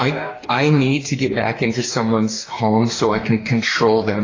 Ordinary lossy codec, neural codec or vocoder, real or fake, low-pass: AAC, 32 kbps; codec, 44.1 kHz, 2.6 kbps, DAC; fake; 7.2 kHz